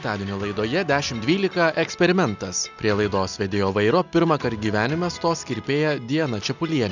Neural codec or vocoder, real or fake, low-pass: none; real; 7.2 kHz